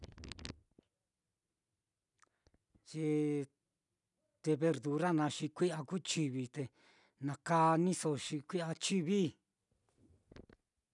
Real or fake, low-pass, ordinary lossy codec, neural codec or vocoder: real; 10.8 kHz; none; none